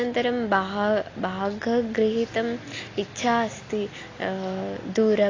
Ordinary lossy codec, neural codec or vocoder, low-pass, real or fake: AAC, 32 kbps; none; 7.2 kHz; real